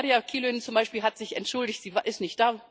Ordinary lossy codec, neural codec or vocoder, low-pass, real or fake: none; none; none; real